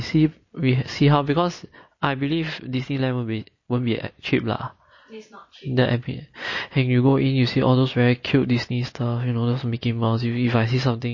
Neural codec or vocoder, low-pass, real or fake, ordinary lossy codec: none; 7.2 kHz; real; MP3, 32 kbps